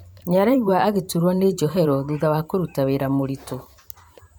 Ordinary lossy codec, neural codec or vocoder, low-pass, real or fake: none; vocoder, 44.1 kHz, 128 mel bands every 512 samples, BigVGAN v2; none; fake